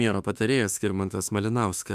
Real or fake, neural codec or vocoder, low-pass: fake; autoencoder, 48 kHz, 32 numbers a frame, DAC-VAE, trained on Japanese speech; 14.4 kHz